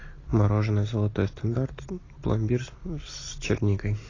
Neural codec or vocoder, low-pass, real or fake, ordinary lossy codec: none; 7.2 kHz; real; AAC, 32 kbps